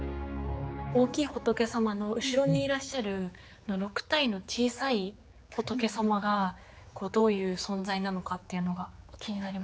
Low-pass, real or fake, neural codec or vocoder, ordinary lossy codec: none; fake; codec, 16 kHz, 4 kbps, X-Codec, HuBERT features, trained on general audio; none